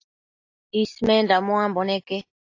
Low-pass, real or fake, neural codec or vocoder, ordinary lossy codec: 7.2 kHz; real; none; AAC, 48 kbps